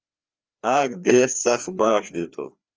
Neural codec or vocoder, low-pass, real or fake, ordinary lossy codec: codec, 16 kHz, 2 kbps, FreqCodec, larger model; 7.2 kHz; fake; Opus, 24 kbps